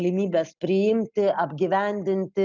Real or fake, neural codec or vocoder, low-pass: real; none; 7.2 kHz